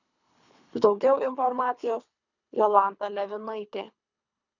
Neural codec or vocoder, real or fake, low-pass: codec, 24 kHz, 3 kbps, HILCodec; fake; 7.2 kHz